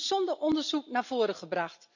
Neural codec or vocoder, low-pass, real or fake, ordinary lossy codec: none; 7.2 kHz; real; none